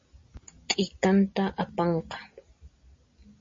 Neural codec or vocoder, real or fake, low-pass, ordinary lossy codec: none; real; 7.2 kHz; MP3, 32 kbps